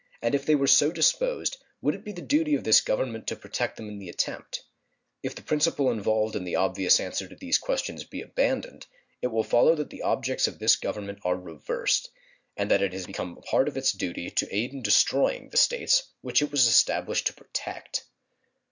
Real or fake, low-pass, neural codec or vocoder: real; 7.2 kHz; none